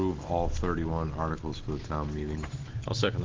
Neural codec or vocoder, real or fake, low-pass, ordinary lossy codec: none; real; 7.2 kHz; Opus, 16 kbps